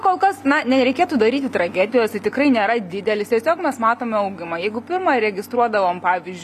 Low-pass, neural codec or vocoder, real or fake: 14.4 kHz; none; real